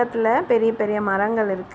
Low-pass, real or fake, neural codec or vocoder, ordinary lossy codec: none; real; none; none